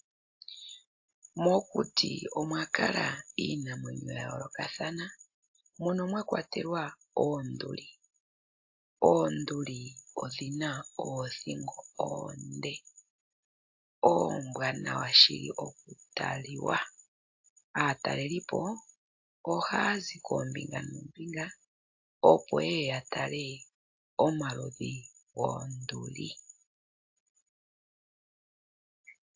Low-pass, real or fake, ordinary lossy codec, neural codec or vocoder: 7.2 kHz; real; AAC, 48 kbps; none